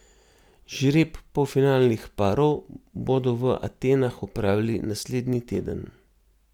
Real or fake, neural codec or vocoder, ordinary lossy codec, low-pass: real; none; none; 19.8 kHz